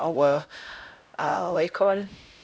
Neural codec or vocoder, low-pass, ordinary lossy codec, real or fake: codec, 16 kHz, 0.5 kbps, X-Codec, HuBERT features, trained on LibriSpeech; none; none; fake